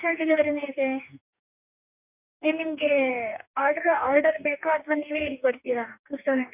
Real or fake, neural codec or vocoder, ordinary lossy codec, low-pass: fake; codec, 44.1 kHz, 2.6 kbps, DAC; none; 3.6 kHz